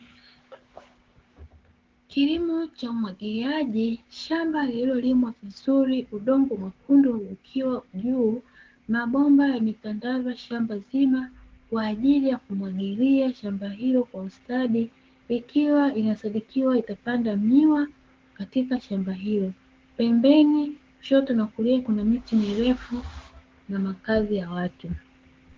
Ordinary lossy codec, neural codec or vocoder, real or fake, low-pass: Opus, 16 kbps; codec, 16 kHz, 6 kbps, DAC; fake; 7.2 kHz